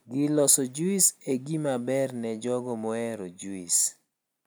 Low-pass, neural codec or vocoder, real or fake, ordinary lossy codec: none; none; real; none